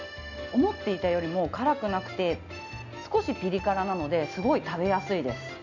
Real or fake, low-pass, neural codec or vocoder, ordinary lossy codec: real; 7.2 kHz; none; none